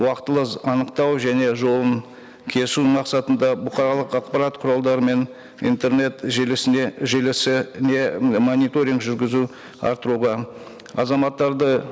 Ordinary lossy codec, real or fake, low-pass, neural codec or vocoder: none; real; none; none